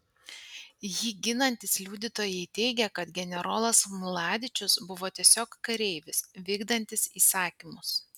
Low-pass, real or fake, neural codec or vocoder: 19.8 kHz; real; none